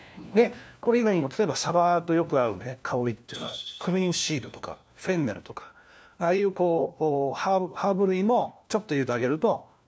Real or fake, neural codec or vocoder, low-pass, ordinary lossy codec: fake; codec, 16 kHz, 1 kbps, FunCodec, trained on LibriTTS, 50 frames a second; none; none